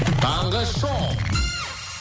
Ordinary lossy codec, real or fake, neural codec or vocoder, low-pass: none; real; none; none